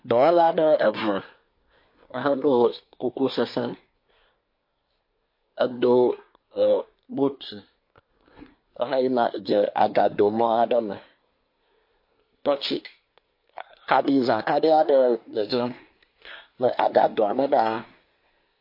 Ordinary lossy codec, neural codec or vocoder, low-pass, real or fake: MP3, 32 kbps; codec, 24 kHz, 1 kbps, SNAC; 5.4 kHz; fake